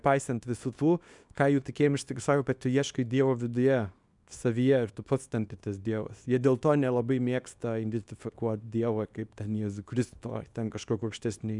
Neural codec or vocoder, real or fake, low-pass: codec, 24 kHz, 0.9 kbps, WavTokenizer, small release; fake; 10.8 kHz